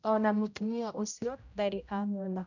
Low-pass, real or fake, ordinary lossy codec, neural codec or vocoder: 7.2 kHz; fake; none; codec, 16 kHz, 0.5 kbps, X-Codec, HuBERT features, trained on general audio